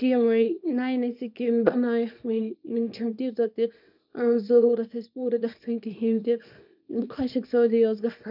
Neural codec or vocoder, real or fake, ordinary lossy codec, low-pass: codec, 24 kHz, 0.9 kbps, WavTokenizer, small release; fake; none; 5.4 kHz